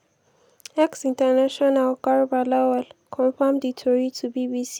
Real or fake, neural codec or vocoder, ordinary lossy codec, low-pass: real; none; none; 19.8 kHz